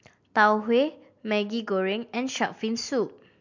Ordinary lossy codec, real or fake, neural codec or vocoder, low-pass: MP3, 48 kbps; real; none; 7.2 kHz